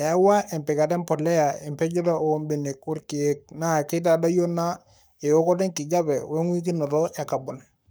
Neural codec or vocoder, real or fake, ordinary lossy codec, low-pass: codec, 44.1 kHz, 7.8 kbps, Pupu-Codec; fake; none; none